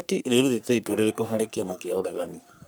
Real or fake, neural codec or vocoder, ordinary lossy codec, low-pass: fake; codec, 44.1 kHz, 3.4 kbps, Pupu-Codec; none; none